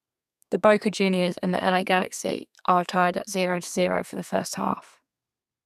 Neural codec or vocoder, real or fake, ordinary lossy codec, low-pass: codec, 32 kHz, 1.9 kbps, SNAC; fake; none; 14.4 kHz